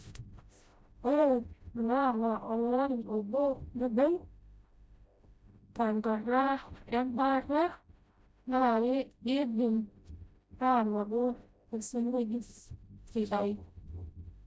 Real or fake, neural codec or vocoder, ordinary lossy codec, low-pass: fake; codec, 16 kHz, 0.5 kbps, FreqCodec, smaller model; none; none